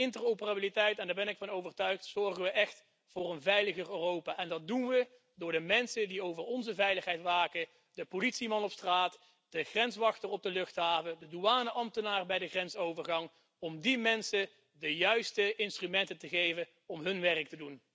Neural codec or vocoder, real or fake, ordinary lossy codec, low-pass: none; real; none; none